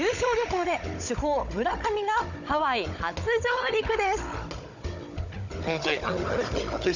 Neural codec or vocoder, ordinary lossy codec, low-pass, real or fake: codec, 16 kHz, 16 kbps, FunCodec, trained on Chinese and English, 50 frames a second; none; 7.2 kHz; fake